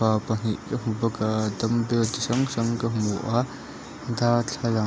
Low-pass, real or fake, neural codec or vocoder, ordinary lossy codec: none; real; none; none